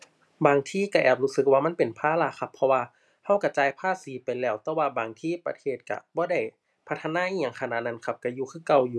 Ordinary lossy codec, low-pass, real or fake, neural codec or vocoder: none; none; real; none